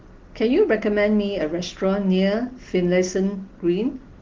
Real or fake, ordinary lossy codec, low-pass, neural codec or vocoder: real; Opus, 16 kbps; 7.2 kHz; none